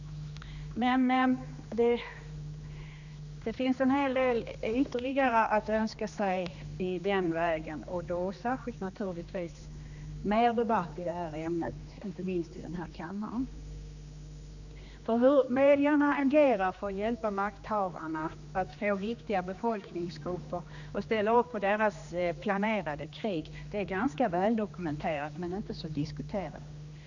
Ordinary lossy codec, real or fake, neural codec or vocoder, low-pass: none; fake; codec, 16 kHz, 2 kbps, X-Codec, HuBERT features, trained on general audio; 7.2 kHz